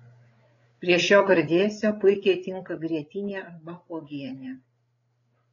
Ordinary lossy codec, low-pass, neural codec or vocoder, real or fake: AAC, 32 kbps; 7.2 kHz; codec, 16 kHz, 8 kbps, FreqCodec, larger model; fake